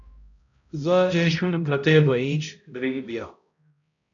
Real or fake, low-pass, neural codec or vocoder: fake; 7.2 kHz; codec, 16 kHz, 0.5 kbps, X-Codec, HuBERT features, trained on balanced general audio